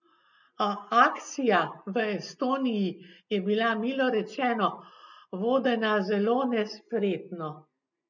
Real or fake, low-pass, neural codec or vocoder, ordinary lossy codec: real; 7.2 kHz; none; none